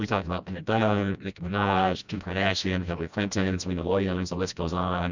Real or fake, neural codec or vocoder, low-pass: fake; codec, 16 kHz, 1 kbps, FreqCodec, smaller model; 7.2 kHz